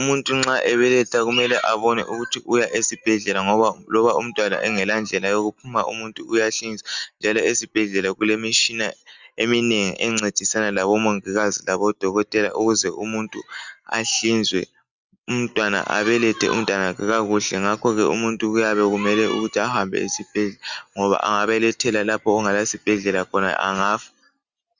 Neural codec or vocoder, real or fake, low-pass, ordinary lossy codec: none; real; 7.2 kHz; Opus, 64 kbps